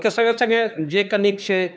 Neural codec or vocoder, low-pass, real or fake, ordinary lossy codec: codec, 16 kHz, 2 kbps, X-Codec, HuBERT features, trained on LibriSpeech; none; fake; none